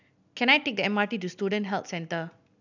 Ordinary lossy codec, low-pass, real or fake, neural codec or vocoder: none; 7.2 kHz; real; none